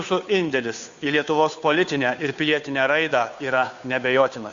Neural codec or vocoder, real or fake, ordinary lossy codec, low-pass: codec, 16 kHz, 2 kbps, FunCodec, trained on Chinese and English, 25 frames a second; fake; Opus, 64 kbps; 7.2 kHz